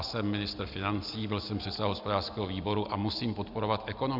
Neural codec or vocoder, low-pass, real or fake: none; 5.4 kHz; real